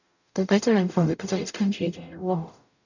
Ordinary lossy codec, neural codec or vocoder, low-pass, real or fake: none; codec, 44.1 kHz, 0.9 kbps, DAC; 7.2 kHz; fake